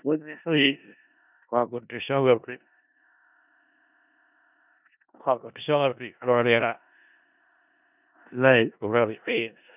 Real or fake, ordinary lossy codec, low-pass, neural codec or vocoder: fake; none; 3.6 kHz; codec, 16 kHz in and 24 kHz out, 0.4 kbps, LongCat-Audio-Codec, four codebook decoder